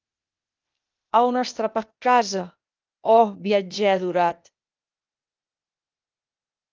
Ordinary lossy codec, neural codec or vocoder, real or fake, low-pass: Opus, 24 kbps; codec, 16 kHz, 0.8 kbps, ZipCodec; fake; 7.2 kHz